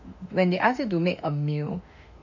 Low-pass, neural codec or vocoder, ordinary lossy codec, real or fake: 7.2 kHz; autoencoder, 48 kHz, 32 numbers a frame, DAC-VAE, trained on Japanese speech; none; fake